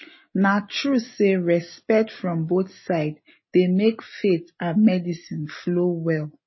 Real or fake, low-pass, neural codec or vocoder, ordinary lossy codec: real; 7.2 kHz; none; MP3, 24 kbps